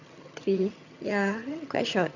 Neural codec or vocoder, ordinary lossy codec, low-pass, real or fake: vocoder, 22.05 kHz, 80 mel bands, HiFi-GAN; none; 7.2 kHz; fake